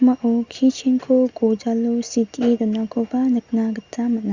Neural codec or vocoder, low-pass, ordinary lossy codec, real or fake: none; 7.2 kHz; Opus, 64 kbps; real